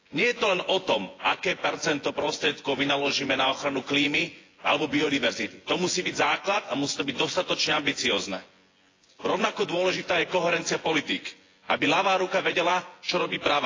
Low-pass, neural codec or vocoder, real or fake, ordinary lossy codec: 7.2 kHz; vocoder, 24 kHz, 100 mel bands, Vocos; fake; AAC, 32 kbps